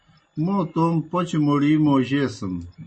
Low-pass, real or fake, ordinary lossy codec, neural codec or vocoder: 10.8 kHz; real; MP3, 32 kbps; none